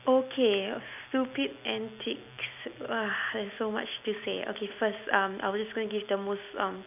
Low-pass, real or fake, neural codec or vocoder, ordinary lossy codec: 3.6 kHz; real; none; none